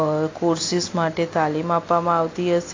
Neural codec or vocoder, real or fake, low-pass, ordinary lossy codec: none; real; 7.2 kHz; AAC, 32 kbps